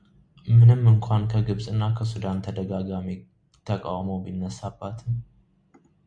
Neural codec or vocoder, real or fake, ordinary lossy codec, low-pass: none; real; MP3, 96 kbps; 9.9 kHz